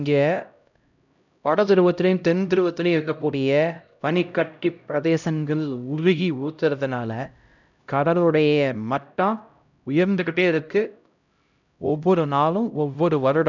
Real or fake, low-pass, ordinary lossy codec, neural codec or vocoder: fake; 7.2 kHz; none; codec, 16 kHz, 0.5 kbps, X-Codec, HuBERT features, trained on LibriSpeech